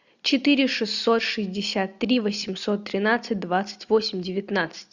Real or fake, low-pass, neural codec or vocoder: real; 7.2 kHz; none